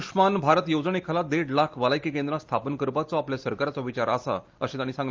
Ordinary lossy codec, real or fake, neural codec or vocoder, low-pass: Opus, 32 kbps; real; none; 7.2 kHz